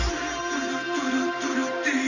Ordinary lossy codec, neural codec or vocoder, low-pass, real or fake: none; none; 7.2 kHz; real